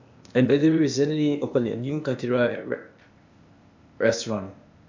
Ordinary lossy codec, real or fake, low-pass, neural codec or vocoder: MP3, 64 kbps; fake; 7.2 kHz; codec, 16 kHz, 0.8 kbps, ZipCodec